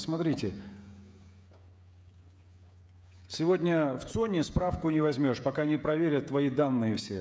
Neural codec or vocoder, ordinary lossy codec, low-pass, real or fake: codec, 16 kHz, 16 kbps, FreqCodec, smaller model; none; none; fake